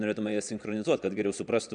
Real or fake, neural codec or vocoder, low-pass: fake; vocoder, 22.05 kHz, 80 mel bands, Vocos; 9.9 kHz